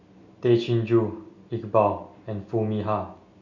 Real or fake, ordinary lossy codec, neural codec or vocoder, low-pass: real; none; none; 7.2 kHz